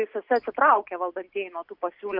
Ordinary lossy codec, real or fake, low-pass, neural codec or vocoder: Opus, 64 kbps; real; 5.4 kHz; none